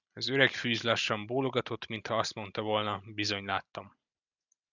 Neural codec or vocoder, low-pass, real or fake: none; 7.2 kHz; real